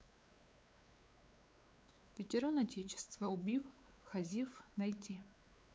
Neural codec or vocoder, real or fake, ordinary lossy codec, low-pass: codec, 16 kHz, 4 kbps, X-Codec, WavLM features, trained on Multilingual LibriSpeech; fake; none; none